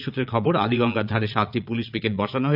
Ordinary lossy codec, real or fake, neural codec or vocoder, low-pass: none; fake; vocoder, 22.05 kHz, 80 mel bands, Vocos; 5.4 kHz